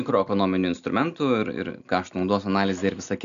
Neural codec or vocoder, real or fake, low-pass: none; real; 7.2 kHz